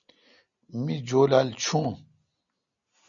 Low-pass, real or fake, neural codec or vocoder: 7.2 kHz; real; none